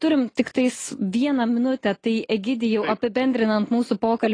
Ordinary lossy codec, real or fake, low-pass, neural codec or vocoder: AAC, 32 kbps; real; 9.9 kHz; none